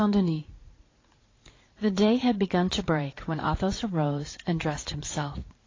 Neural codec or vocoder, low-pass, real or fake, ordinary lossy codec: vocoder, 44.1 kHz, 80 mel bands, Vocos; 7.2 kHz; fake; AAC, 32 kbps